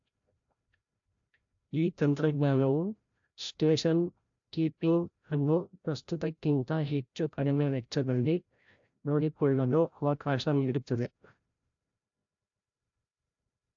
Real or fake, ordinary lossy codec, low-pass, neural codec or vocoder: fake; AAC, 64 kbps; 7.2 kHz; codec, 16 kHz, 0.5 kbps, FreqCodec, larger model